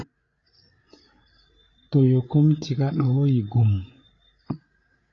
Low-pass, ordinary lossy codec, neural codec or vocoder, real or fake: 7.2 kHz; AAC, 32 kbps; codec, 16 kHz, 8 kbps, FreqCodec, larger model; fake